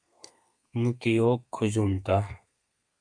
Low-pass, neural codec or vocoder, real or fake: 9.9 kHz; codec, 32 kHz, 1.9 kbps, SNAC; fake